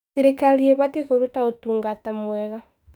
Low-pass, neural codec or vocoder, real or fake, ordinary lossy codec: 19.8 kHz; autoencoder, 48 kHz, 32 numbers a frame, DAC-VAE, trained on Japanese speech; fake; none